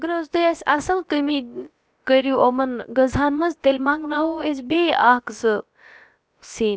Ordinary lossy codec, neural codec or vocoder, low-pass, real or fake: none; codec, 16 kHz, about 1 kbps, DyCAST, with the encoder's durations; none; fake